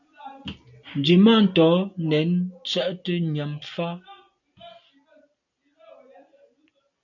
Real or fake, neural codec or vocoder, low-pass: real; none; 7.2 kHz